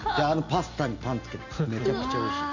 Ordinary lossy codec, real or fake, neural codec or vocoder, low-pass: none; real; none; 7.2 kHz